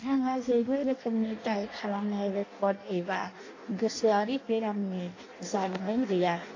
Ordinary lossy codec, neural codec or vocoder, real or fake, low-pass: AAC, 32 kbps; codec, 16 kHz in and 24 kHz out, 0.6 kbps, FireRedTTS-2 codec; fake; 7.2 kHz